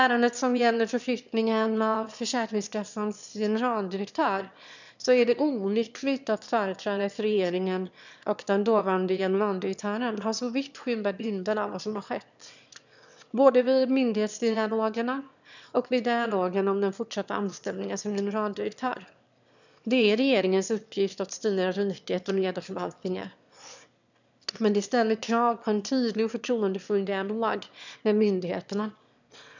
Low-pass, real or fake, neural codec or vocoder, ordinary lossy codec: 7.2 kHz; fake; autoencoder, 22.05 kHz, a latent of 192 numbers a frame, VITS, trained on one speaker; none